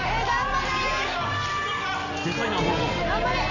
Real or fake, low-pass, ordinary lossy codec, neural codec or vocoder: real; 7.2 kHz; none; none